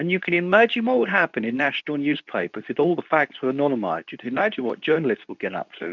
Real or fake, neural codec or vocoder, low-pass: fake; codec, 24 kHz, 0.9 kbps, WavTokenizer, medium speech release version 2; 7.2 kHz